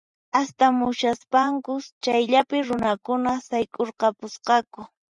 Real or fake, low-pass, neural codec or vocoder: fake; 10.8 kHz; vocoder, 44.1 kHz, 128 mel bands every 512 samples, BigVGAN v2